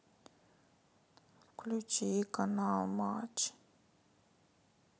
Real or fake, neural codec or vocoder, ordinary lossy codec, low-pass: real; none; none; none